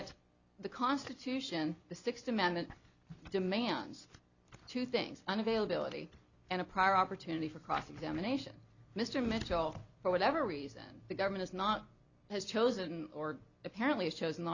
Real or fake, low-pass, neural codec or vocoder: real; 7.2 kHz; none